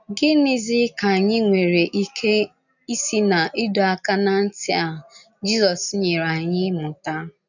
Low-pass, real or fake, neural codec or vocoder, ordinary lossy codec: 7.2 kHz; real; none; none